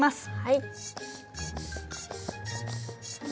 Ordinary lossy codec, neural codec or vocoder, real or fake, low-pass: none; none; real; none